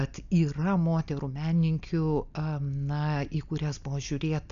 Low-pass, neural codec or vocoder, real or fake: 7.2 kHz; none; real